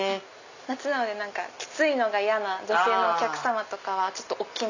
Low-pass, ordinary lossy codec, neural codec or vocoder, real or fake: 7.2 kHz; none; none; real